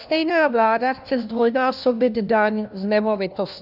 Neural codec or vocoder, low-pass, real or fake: codec, 16 kHz, 1 kbps, FunCodec, trained on LibriTTS, 50 frames a second; 5.4 kHz; fake